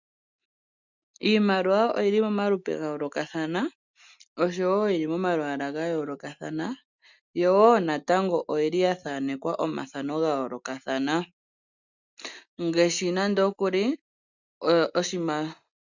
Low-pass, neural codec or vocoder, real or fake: 7.2 kHz; none; real